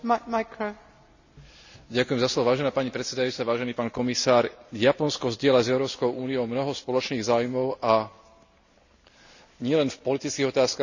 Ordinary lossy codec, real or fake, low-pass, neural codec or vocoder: none; real; 7.2 kHz; none